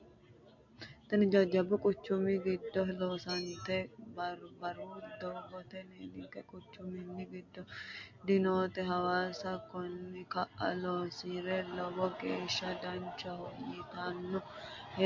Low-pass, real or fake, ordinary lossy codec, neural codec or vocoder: 7.2 kHz; real; MP3, 48 kbps; none